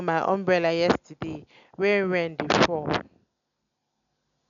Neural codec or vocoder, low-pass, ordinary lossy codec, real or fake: none; 7.2 kHz; none; real